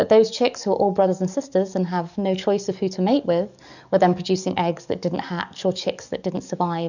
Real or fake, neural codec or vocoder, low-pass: fake; codec, 44.1 kHz, 7.8 kbps, DAC; 7.2 kHz